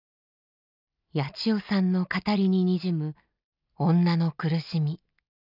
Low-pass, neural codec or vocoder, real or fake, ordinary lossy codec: 5.4 kHz; none; real; none